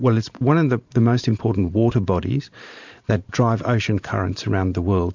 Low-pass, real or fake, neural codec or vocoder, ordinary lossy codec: 7.2 kHz; fake; vocoder, 44.1 kHz, 128 mel bands every 512 samples, BigVGAN v2; MP3, 64 kbps